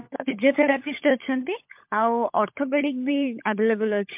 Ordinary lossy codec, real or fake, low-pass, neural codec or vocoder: MP3, 32 kbps; fake; 3.6 kHz; codec, 16 kHz, 16 kbps, FunCodec, trained on LibriTTS, 50 frames a second